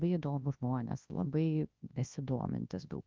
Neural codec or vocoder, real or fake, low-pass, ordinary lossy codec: codec, 24 kHz, 0.9 kbps, WavTokenizer, large speech release; fake; 7.2 kHz; Opus, 32 kbps